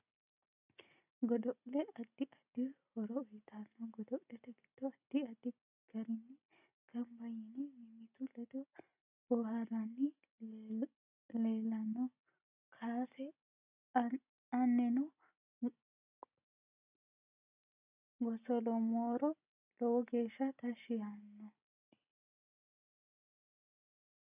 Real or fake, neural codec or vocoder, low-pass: real; none; 3.6 kHz